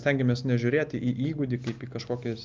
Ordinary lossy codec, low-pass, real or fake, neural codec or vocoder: Opus, 32 kbps; 7.2 kHz; real; none